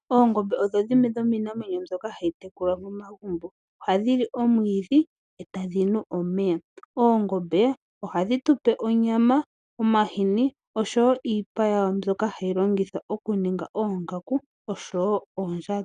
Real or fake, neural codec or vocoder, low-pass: real; none; 9.9 kHz